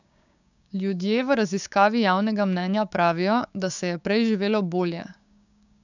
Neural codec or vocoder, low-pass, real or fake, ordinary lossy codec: codec, 16 kHz, 6 kbps, DAC; 7.2 kHz; fake; none